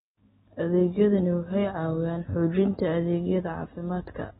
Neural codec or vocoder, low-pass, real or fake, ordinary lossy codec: none; 19.8 kHz; real; AAC, 16 kbps